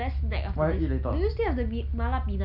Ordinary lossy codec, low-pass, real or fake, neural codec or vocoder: none; 5.4 kHz; real; none